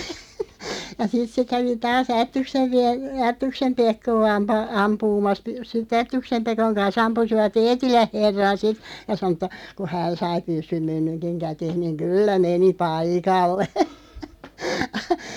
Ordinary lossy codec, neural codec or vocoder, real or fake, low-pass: none; none; real; 19.8 kHz